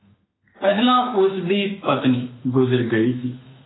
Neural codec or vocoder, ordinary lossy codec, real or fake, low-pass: autoencoder, 48 kHz, 32 numbers a frame, DAC-VAE, trained on Japanese speech; AAC, 16 kbps; fake; 7.2 kHz